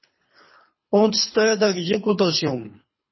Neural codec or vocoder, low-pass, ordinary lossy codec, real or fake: codec, 24 kHz, 3 kbps, HILCodec; 7.2 kHz; MP3, 24 kbps; fake